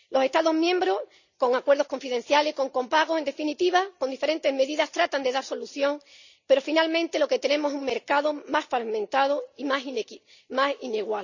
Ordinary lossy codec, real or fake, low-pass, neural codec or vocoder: none; real; 7.2 kHz; none